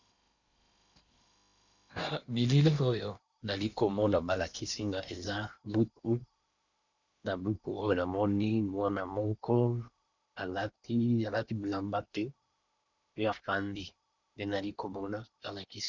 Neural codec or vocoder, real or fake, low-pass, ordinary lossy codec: codec, 16 kHz in and 24 kHz out, 0.8 kbps, FocalCodec, streaming, 65536 codes; fake; 7.2 kHz; Opus, 64 kbps